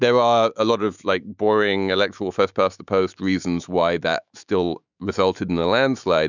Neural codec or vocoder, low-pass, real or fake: autoencoder, 48 kHz, 128 numbers a frame, DAC-VAE, trained on Japanese speech; 7.2 kHz; fake